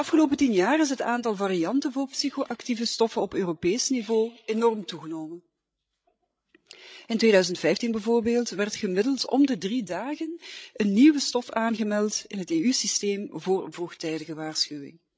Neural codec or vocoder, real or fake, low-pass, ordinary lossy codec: codec, 16 kHz, 16 kbps, FreqCodec, larger model; fake; none; none